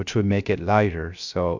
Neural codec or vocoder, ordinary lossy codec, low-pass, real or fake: codec, 16 kHz, 0.3 kbps, FocalCodec; Opus, 64 kbps; 7.2 kHz; fake